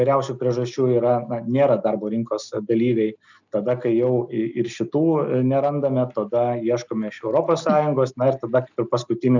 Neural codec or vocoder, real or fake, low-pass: none; real; 7.2 kHz